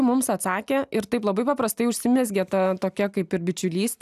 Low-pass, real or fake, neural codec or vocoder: 14.4 kHz; real; none